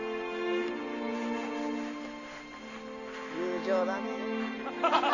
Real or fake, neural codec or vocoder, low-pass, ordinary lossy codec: real; none; 7.2 kHz; none